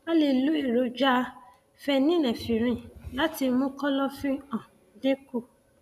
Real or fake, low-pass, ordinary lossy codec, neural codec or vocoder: real; 14.4 kHz; none; none